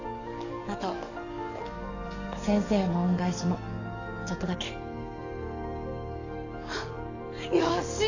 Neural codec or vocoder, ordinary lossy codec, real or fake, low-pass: codec, 44.1 kHz, 7.8 kbps, DAC; none; fake; 7.2 kHz